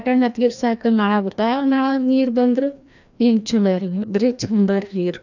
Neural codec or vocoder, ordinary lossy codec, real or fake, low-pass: codec, 16 kHz, 1 kbps, FreqCodec, larger model; none; fake; 7.2 kHz